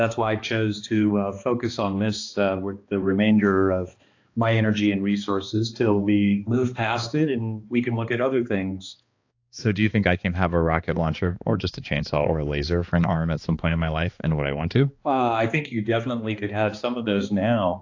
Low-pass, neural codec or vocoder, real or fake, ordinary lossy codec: 7.2 kHz; codec, 16 kHz, 2 kbps, X-Codec, HuBERT features, trained on balanced general audio; fake; AAC, 48 kbps